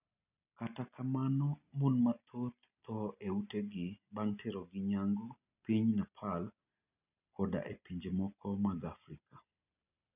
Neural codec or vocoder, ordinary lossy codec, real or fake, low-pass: none; none; real; 3.6 kHz